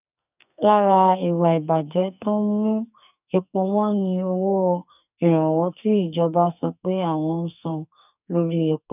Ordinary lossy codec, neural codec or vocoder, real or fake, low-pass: none; codec, 44.1 kHz, 2.6 kbps, SNAC; fake; 3.6 kHz